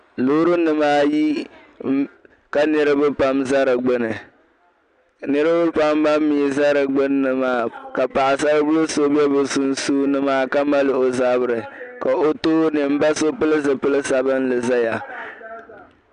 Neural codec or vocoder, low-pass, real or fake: none; 9.9 kHz; real